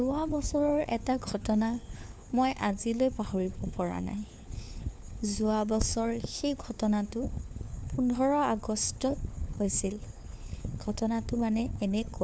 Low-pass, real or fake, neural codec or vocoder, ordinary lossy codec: none; fake; codec, 16 kHz, 4 kbps, FunCodec, trained on LibriTTS, 50 frames a second; none